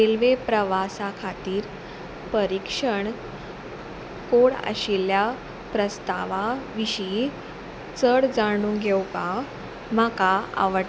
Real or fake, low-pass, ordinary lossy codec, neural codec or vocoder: real; none; none; none